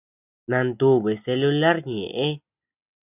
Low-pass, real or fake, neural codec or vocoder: 3.6 kHz; real; none